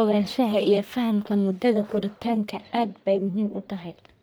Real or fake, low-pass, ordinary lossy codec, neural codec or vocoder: fake; none; none; codec, 44.1 kHz, 1.7 kbps, Pupu-Codec